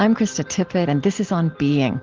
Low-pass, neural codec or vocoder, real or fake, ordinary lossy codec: 7.2 kHz; none; real; Opus, 16 kbps